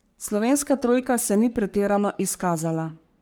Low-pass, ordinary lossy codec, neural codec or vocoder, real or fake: none; none; codec, 44.1 kHz, 3.4 kbps, Pupu-Codec; fake